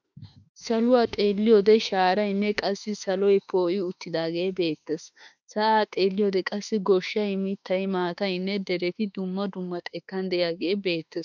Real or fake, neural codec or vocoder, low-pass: fake; autoencoder, 48 kHz, 32 numbers a frame, DAC-VAE, trained on Japanese speech; 7.2 kHz